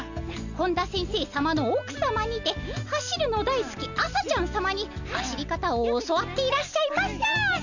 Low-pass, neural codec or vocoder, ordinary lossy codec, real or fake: 7.2 kHz; none; none; real